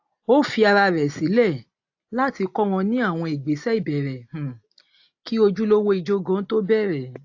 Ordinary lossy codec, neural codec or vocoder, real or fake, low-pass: AAC, 48 kbps; none; real; 7.2 kHz